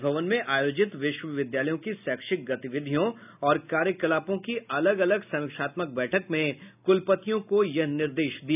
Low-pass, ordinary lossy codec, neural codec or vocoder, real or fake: 3.6 kHz; none; none; real